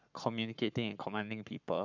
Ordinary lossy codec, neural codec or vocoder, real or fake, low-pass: none; codec, 44.1 kHz, 7.8 kbps, Pupu-Codec; fake; 7.2 kHz